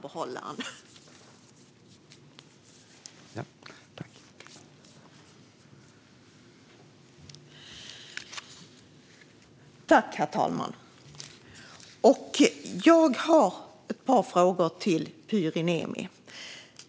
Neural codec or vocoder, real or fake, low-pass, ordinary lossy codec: none; real; none; none